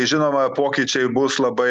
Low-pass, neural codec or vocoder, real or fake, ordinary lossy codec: 10.8 kHz; none; real; Opus, 64 kbps